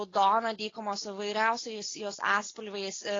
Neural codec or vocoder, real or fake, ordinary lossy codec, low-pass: none; real; AAC, 32 kbps; 7.2 kHz